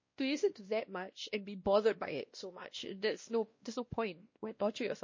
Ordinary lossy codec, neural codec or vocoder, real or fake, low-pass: MP3, 32 kbps; codec, 16 kHz, 1 kbps, X-Codec, WavLM features, trained on Multilingual LibriSpeech; fake; 7.2 kHz